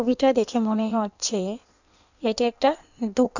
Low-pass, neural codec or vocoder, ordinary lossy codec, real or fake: 7.2 kHz; codec, 16 kHz in and 24 kHz out, 1.1 kbps, FireRedTTS-2 codec; none; fake